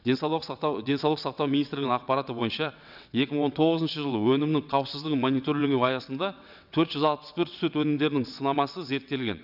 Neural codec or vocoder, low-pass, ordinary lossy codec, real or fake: vocoder, 22.05 kHz, 80 mel bands, WaveNeXt; 5.4 kHz; none; fake